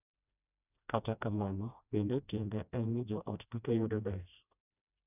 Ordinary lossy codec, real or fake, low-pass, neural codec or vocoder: none; fake; 3.6 kHz; codec, 16 kHz, 1 kbps, FreqCodec, smaller model